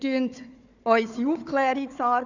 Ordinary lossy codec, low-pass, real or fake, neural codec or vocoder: none; 7.2 kHz; fake; codec, 16 kHz, 4 kbps, FunCodec, trained on Chinese and English, 50 frames a second